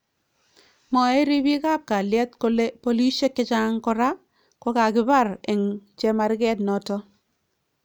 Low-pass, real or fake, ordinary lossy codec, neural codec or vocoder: none; real; none; none